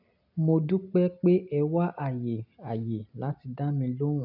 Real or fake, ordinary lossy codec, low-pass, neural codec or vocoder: real; none; 5.4 kHz; none